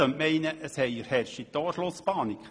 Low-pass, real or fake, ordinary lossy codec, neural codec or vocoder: 9.9 kHz; real; none; none